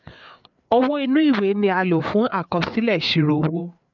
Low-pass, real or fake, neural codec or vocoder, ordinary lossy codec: 7.2 kHz; fake; codec, 16 kHz, 4 kbps, FreqCodec, larger model; none